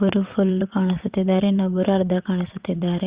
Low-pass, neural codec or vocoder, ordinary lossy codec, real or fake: 3.6 kHz; vocoder, 22.05 kHz, 80 mel bands, WaveNeXt; Opus, 64 kbps; fake